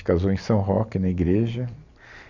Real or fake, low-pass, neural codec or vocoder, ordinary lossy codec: real; 7.2 kHz; none; none